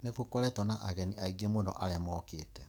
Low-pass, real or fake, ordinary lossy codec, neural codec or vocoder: none; fake; none; codec, 44.1 kHz, 7.8 kbps, DAC